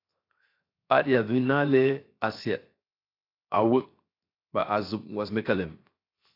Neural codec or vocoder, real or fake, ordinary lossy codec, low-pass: codec, 16 kHz, 0.7 kbps, FocalCodec; fake; AAC, 32 kbps; 5.4 kHz